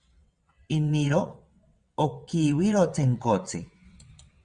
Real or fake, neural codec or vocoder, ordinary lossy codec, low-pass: fake; vocoder, 22.05 kHz, 80 mel bands, WaveNeXt; Opus, 64 kbps; 9.9 kHz